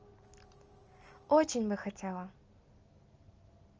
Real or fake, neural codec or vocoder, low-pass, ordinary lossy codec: real; none; 7.2 kHz; Opus, 24 kbps